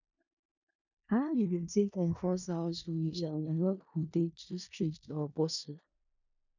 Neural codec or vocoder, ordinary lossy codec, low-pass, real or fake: codec, 16 kHz in and 24 kHz out, 0.4 kbps, LongCat-Audio-Codec, four codebook decoder; none; 7.2 kHz; fake